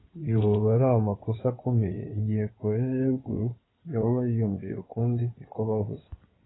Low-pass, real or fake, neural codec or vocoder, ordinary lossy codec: 7.2 kHz; fake; codec, 16 kHz in and 24 kHz out, 2.2 kbps, FireRedTTS-2 codec; AAC, 16 kbps